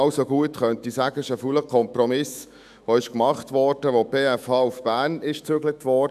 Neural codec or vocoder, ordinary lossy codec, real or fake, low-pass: autoencoder, 48 kHz, 128 numbers a frame, DAC-VAE, trained on Japanese speech; none; fake; 14.4 kHz